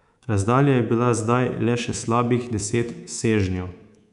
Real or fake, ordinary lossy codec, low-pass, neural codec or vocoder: fake; none; 10.8 kHz; codec, 24 kHz, 3.1 kbps, DualCodec